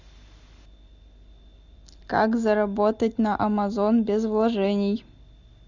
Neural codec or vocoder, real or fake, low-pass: none; real; 7.2 kHz